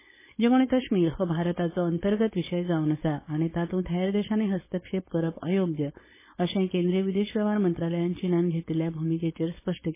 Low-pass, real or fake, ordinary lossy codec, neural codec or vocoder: 3.6 kHz; fake; MP3, 16 kbps; codec, 16 kHz, 4.8 kbps, FACodec